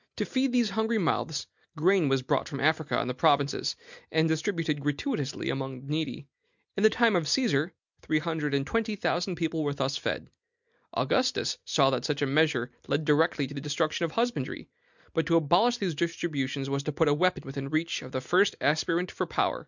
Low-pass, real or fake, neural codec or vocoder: 7.2 kHz; real; none